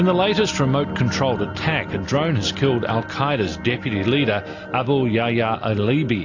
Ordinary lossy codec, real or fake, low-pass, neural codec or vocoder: AAC, 48 kbps; real; 7.2 kHz; none